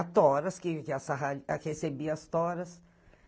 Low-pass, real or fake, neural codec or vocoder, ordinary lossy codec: none; real; none; none